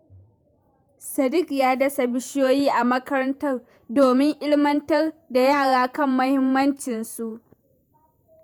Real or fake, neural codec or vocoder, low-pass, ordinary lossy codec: fake; vocoder, 48 kHz, 128 mel bands, Vocos; 19.8 kHz; none